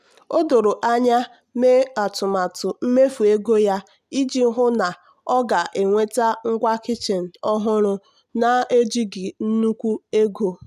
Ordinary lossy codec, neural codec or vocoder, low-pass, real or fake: none; none; 14.4 kHz; real